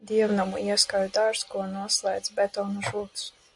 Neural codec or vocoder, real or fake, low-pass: none; real; 10.8 kHz